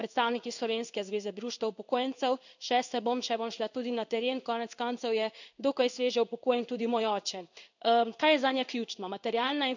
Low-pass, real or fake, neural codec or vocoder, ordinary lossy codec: 7.2 kHz; fake; codec, 16 kHz in and 24 kHz out, 1 kbps, XY-Tokenizer; none